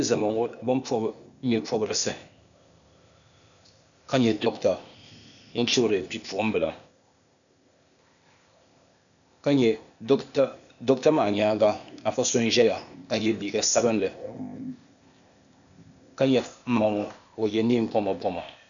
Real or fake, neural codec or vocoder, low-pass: fake; codec, 16 kHz, 0.8 kbps, ZipCodec; 7.2 kHz